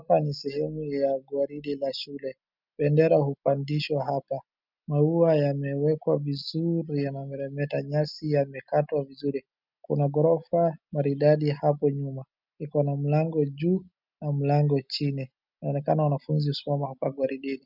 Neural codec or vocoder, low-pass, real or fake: none; 5.4 kHz; real